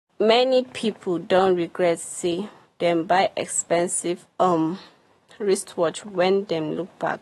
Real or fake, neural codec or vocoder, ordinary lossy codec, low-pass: fake; autoencoder, 48 kHz, 128 numbers a frame, DAC-VAE, trained on Japanese speech; AAC, 32 kbps; 19.8 kHz